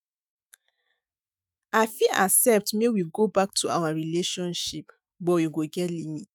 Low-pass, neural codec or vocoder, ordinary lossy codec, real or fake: none; autoencoder, 48 kHz, 128 numbers a frame, DAC-VAE, trained on Japanese speech; none; fake